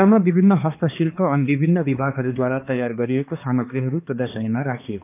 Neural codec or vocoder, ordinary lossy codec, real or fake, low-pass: codec, 16 kHz, 2 kbps, X-Codec, HuBERT features, trained on balanced general audio; none; fake; 3.6 kHz